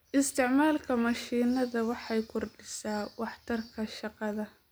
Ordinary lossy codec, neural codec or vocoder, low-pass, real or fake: none; none; none; real